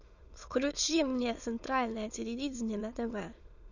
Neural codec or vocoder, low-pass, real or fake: autoencoder, 22.05 kHz, a latent of 192 numbers a frame, VITS, trained on many speakers; 7.2 kHz; fake